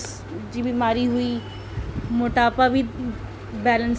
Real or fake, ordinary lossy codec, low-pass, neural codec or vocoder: real; none; none; none